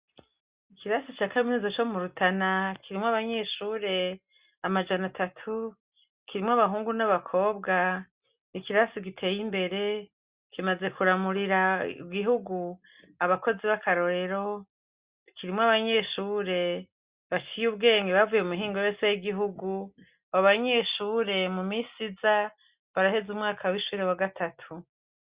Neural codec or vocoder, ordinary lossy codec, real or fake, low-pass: none; Opus, 64 kbps; real; 3.6 kHz